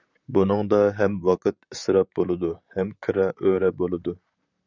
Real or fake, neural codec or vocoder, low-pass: fake; vocoder, 44.1 kHz, 128 mel bands, Pupu-Vocoder; 7.2 kHz